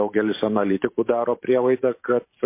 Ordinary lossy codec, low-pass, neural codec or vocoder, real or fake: MP3, 24 kbps; 3.6 kHz; vocoder, 44.1 kHz, 128 mel bands every 512 samples, BigVGAN v2; fake